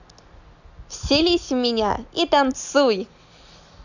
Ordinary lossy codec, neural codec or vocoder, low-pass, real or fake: none; none; 7.2 kHz; real